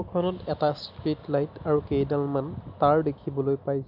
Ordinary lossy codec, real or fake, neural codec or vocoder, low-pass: AAC, 48 kbps; real; none; 5.4 kHz